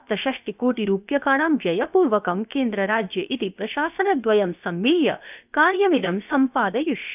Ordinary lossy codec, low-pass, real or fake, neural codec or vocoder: none; 3.6 kHz; fake; codec, 16 kHz, about 1 kbps, DyCAST, with the encoder's durations